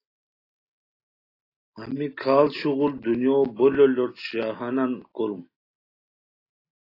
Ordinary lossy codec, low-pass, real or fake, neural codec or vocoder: AAC, 24 kbps; 5.4 kHz; real; none